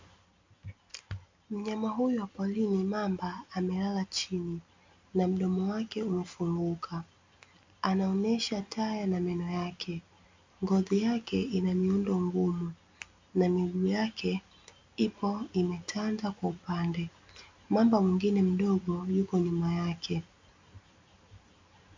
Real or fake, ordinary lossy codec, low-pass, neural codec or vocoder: real; MP3, 64 kbps; 7.2 kHz; none